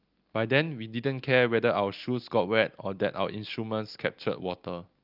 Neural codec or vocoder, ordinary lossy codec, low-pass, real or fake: none; Opus, 32 kbps; 5.4 kHz; real